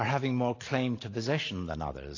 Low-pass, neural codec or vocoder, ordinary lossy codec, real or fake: 7.2 kHz; none; AAC, 32 kbps; real